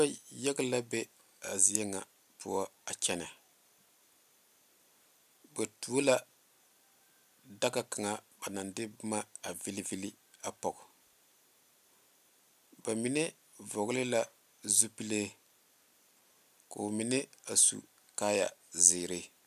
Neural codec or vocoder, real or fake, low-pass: none; real; 14.4 kHz